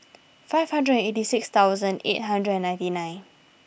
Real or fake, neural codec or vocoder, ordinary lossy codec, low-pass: real; none; none; none